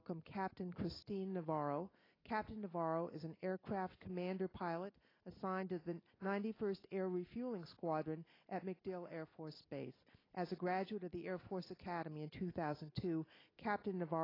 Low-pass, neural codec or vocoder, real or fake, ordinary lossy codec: 5.4 kHz; none; real; AAC, 24 kbps